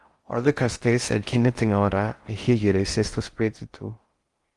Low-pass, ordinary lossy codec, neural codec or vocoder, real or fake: 10.8 kHz; Opus, 24 kbps; codec, 16 kHz in and 24 kHz out, 0.6 kbps, FocalCodec, streaming, 4096 codes; fake